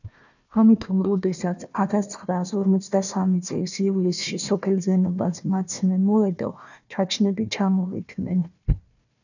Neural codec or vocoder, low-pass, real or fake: codec, 16 kHz, 1 kbps, FunCodec, trained on Chinese and English, 50 frames a second; 7.2 kHz; fake